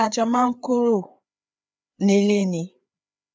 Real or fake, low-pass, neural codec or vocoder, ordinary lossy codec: fake; none; codec, 16 kHz, 4 kbps, FreqCodec, larger model; none